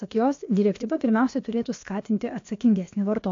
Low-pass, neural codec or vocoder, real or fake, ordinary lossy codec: 7.2 kHz; codec, 16 kHz, about 1 kbps, DyCAST, with the encoder's durations; fake; AAC, 48 kbps